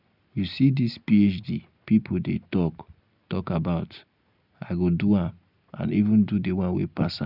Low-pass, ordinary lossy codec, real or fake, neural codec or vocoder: 5.4 kHz; none; real; none